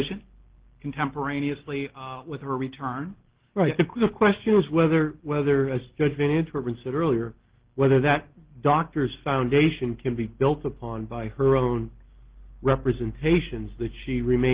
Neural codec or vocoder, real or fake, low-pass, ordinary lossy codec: none; real; 3.6 kHz; Opus, 16 kbps